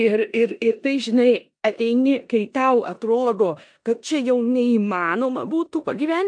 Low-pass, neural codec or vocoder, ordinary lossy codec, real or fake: 9.9 kHz; codec, 16 kHz in and 24 kHz out, 0.9 kbps, LongCat-Audio-Codec, four codebook decoder; AAC, 64 kbps; fake